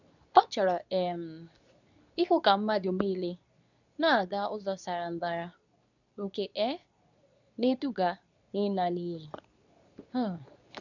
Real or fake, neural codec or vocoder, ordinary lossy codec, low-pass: fake; codec, 24 kHz, 0.9 kbps, WavTokenizer, medium speech release version 2; none; 7.2 kHz